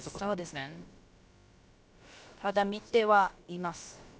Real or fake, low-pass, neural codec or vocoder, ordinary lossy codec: fake; none; codec, 16 kHz, about 1 kbps, DyCAST, with the encoder's durations; none